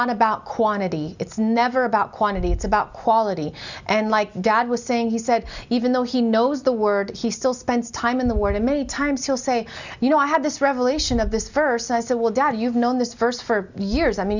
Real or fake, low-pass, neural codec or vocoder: real; 7.2 kHz; none